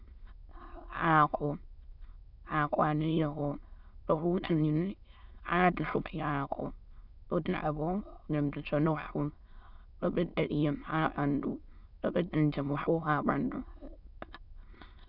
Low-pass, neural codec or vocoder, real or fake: 5.4 kHz; autoencoder, 22.05 kHz, a latent of 192 numbers a frame, VITS, trained on many speakers; fake